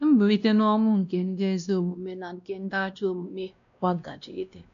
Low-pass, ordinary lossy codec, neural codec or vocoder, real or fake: 7.2 kHz; none; codec, 16 kHz, 1 kbps, X-Codec, WavLM features, trained on Multilingual LibriSpeech; fake